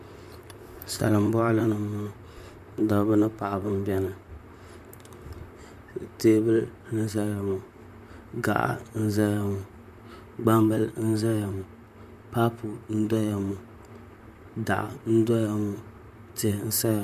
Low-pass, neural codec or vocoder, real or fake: 14.4 kHz; vocoder, 44.1 kHz, 128 mel bands, Pupu-Vocoder; fake